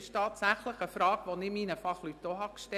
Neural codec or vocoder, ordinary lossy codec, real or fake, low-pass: none; none; real; 14.4 kHz